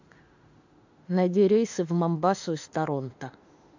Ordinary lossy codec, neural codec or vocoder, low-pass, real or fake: AAC, 48 kbps; autoencoder, 48 kHz, 32 numbers a frame, DAC-VAE, trained on Japanese speech; 7.2 kHz; fake